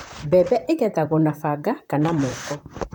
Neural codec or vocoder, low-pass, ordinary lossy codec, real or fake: vocoder, 44.1 kHz, 128 mel bands, Pupu-Vocoder; none; none; fake